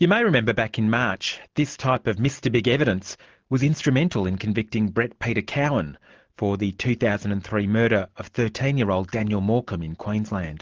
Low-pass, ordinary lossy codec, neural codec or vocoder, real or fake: 7.2 kHz; Opus, 16 kbps; none; real